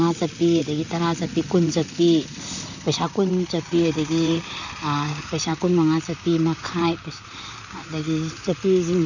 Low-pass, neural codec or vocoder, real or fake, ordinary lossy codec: 7.2 kHz; vocoder, 44.1 kHz, 128 mel bands, Pupu-Vocoder; fake; none